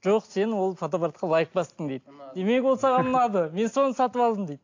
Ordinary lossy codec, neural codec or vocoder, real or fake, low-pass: AAC, 48 kbps; none; real; 7.2 kHz